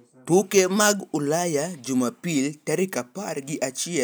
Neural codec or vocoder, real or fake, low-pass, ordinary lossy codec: none; real; none; none